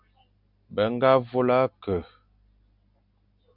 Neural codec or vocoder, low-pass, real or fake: none; 5.4 kHz; real